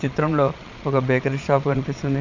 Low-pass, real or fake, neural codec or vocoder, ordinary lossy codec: 7.2 kHz; fake; vocoder, 22.05 kHz, 80 mel bands, Vocos; none